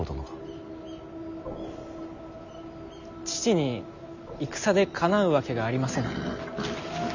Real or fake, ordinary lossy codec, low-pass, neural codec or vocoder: real; none; 7.2 kHz; none